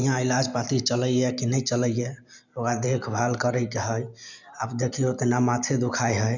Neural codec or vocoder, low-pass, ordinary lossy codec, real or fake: none; 7.2 kHz; none; real